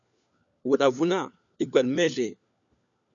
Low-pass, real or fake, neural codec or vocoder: 7.2 kHz; fake; codec, 16 kHz, 4 kbps, FunCodec, trained on LibriTTS, 50 frames a second